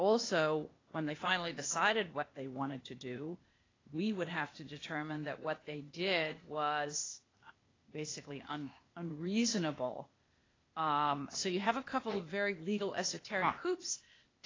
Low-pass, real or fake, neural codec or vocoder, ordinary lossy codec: 7.2 kHz; fake; codec, 16 kHz, 0.8 kbps, ZipCodec; AAC, 32 kbps